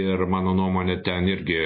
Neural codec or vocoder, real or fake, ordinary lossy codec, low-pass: none; real; MP3, 24 kbps; 5.4 kHz